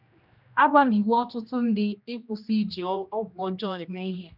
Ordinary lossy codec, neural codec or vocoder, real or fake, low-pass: none; codec, 16 kHz, 1 kbps, X-Codec, HuBERT features, trained on general audio; fake; 5.4 kHz